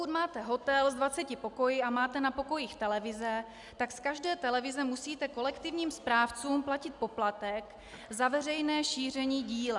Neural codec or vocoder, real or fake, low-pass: none; real; 10.8 kHz